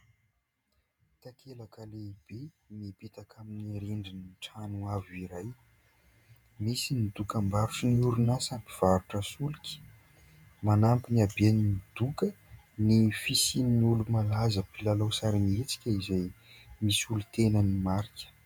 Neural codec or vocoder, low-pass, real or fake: vocoder, 48 kHz, 128 mel bands, Vocos; 19.8 kHz; fake